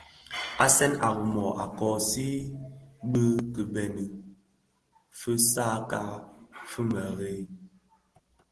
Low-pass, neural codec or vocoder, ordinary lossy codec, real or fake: 10.8 kHz; none; Opus, 16 kbps; real